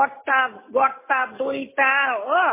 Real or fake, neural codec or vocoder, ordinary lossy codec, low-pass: real; none; MP3, 16 kbps; 3.6 kHz